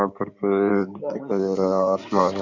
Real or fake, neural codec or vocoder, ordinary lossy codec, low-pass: fake; codec, 16 kHz in and 24 kHz out, 2.2 kbps, FireRedTTS-2 codec; none; 7.2 kHz